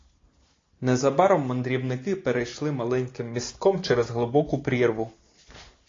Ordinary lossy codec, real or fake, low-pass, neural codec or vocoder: AAC, 32 kbps; real; 7.2 kHz; none